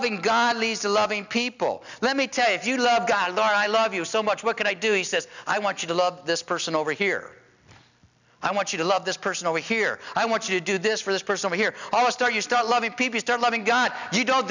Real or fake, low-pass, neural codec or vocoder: real; 7.2 kHz; none